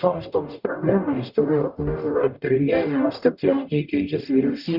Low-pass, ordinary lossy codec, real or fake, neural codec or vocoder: 5.4 kHz; Opus, 64 kbps; fake; codec, 44.1 kHz, 0.9 kbps, DAC